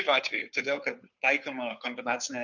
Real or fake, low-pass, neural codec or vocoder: fake; 7.2 kHz; codec, 16 kHz, 2 kbps, FunCodec, trained on Chinese and English, 25 frames a second